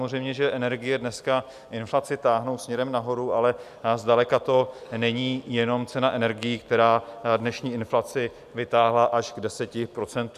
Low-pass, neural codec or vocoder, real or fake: 14.4 kHz; none; real